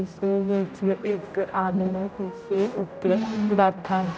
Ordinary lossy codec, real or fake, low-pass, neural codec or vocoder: none; fake; none; codec, 16 kHz, 0.5 kbps, X-Codec, HuBERT features, trained on general audio